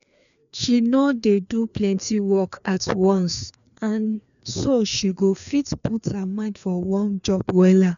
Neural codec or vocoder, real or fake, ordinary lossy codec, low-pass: codec, 16 kHz, 2 kbps, FreqCodec, larger model; fake; none; 7.2 kHz